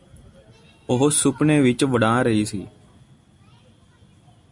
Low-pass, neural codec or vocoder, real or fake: 10.8 kHz; none; real